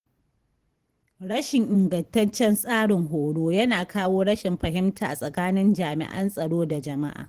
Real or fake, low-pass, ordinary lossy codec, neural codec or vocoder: fake; 14.4 kHz; Opus, 16 kbps; vocoder, 44.1 kHz, 128 mel bands every 512 samples, BigVGAN v2